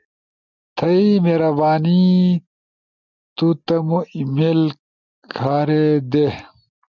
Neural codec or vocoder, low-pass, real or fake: none; 7.2 kHz; real